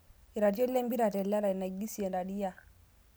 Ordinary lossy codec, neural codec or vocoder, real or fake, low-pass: none; none; real; none